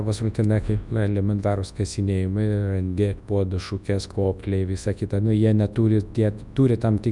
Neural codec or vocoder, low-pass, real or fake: codec, 24 kHz, 0.9 kbps, WavTokenizer, large speech release; 10.8 kHz; fake